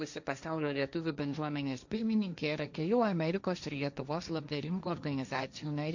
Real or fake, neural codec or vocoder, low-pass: fake; codec, 16 kHz, 1.1 kbps, Voila-Tokenizer; 7.2 kHz